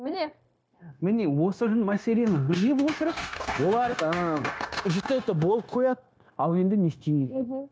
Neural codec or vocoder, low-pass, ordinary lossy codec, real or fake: codec, 16 kHz, 0.9 kbps, LongCat-Audio-Codec; none; none; fake